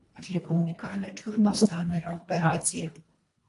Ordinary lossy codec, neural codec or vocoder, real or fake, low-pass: AAC, 48 kbps; codec, 24 kHz, 1.5 kbps, HILCodec; fake; 10.8 kHz